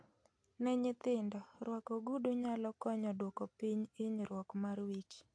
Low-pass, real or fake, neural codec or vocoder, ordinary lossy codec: 9.9 kHz; real; none; AAC, 64 kbps